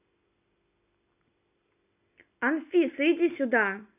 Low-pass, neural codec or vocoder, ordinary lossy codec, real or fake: 3.6 kHz; none; none; real